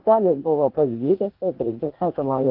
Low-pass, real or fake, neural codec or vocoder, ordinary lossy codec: 5.4 kHz; fake; codec, 16 kHz, 0.8 kbps, ZipCodec; Opus, 16 kbps